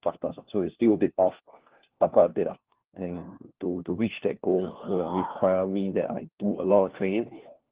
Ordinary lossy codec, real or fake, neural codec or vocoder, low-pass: Opus, 16 kbps; fake; codec, 16 kHz, 1 kbps, FunCodec, trained on LibriTTS, 50 frames a second; 3.6 kHz